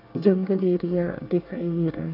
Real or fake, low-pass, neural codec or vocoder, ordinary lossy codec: fake; 5.4 kHz; codec, 24 kHz, 1 kbps, SNAC; AAC, 32 kbps